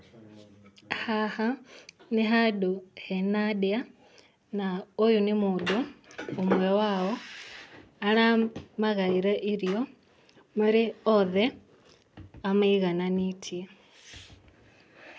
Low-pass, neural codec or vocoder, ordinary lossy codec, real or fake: none; none; none; real